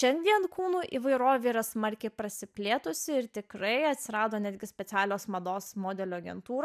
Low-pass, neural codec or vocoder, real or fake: 14.4 kHz; none; real